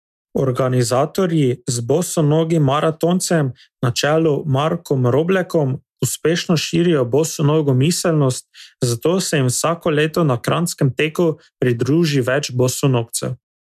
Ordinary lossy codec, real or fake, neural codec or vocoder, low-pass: MP3, 96 kbps; real; none; 14.4 kHz